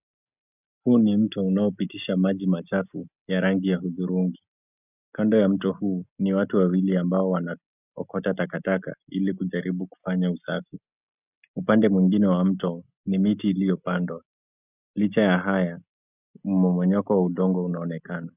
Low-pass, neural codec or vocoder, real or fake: 3.6 kHz; none; real